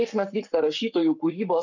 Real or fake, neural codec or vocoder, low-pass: fake; codec, 44.1 kHz, 7.8 kbps, Pupu-Codec; 7.2 kHz